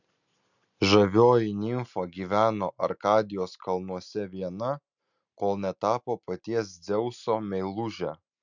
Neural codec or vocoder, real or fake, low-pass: none; real; 7.2 kHz